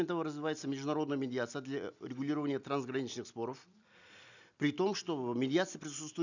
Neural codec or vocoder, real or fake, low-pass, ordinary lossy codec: none; real; 7.2 kHz; none